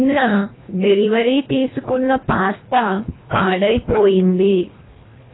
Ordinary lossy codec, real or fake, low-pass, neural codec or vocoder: AAC, 16 kbps; fake; 7.2 kHz; codec, 24 kHz, 1.5 kbps, HILCodec